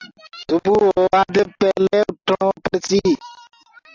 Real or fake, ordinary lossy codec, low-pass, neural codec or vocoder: real; AAC, 48 kbps; 7.2 kHz; none